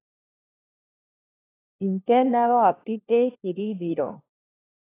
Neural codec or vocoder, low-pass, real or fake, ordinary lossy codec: codec, 16 kHz, 1 kbps, FunCodec, trained on LibriTTS, 50 frames a second; 3.6 kHz; fake; AAC, 24 kbps